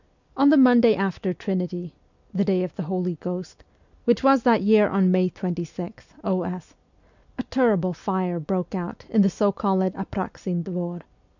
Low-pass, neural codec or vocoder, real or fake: 7.2 kHz; none; real